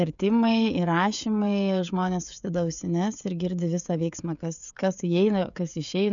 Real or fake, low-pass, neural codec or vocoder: fake; 7.2 kHz; codec, 16 kHz, 16 kbps, FreqCodec, smaller model